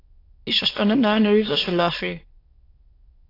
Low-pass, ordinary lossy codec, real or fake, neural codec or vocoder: 5.4 kHz; AAC, 24 kbps; fake; autoencoder, 22.05 kHz, a latent of 192 numbers a frame, VITS, trained on many speakers